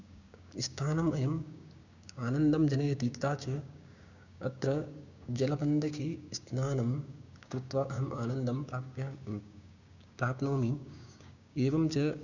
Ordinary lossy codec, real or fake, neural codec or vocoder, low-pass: none; fake; codec, 16 kHz, 6 kbps, DAC; 7.2 kHz